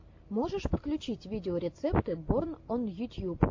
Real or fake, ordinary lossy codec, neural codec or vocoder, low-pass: real; AAC, 48 kbps; none; 7.2 kHz